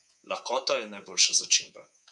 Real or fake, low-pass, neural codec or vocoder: fake; 10.8 kHz; codec, 24 kHz, 3.1 kbps, DualCodec